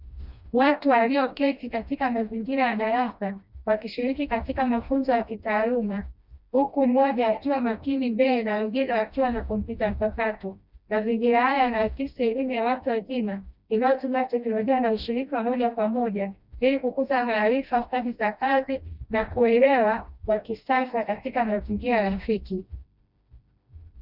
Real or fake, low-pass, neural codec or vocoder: fake; 5.4 kHz; codec, 16 kHz, 1 kbps, FreqCodec, smaller model